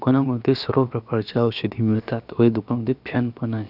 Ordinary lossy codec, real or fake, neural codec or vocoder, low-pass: none; fake; codec, 16 kHz, about 1 kbps, DyCAST, with the encoder's durations; 5.4 kHz